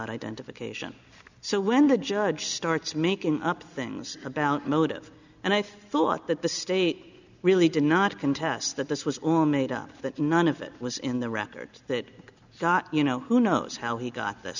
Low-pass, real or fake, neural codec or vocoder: 7.2 kHz; real; none